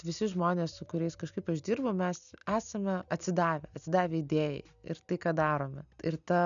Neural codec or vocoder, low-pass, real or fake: none; 7.2 kHz; real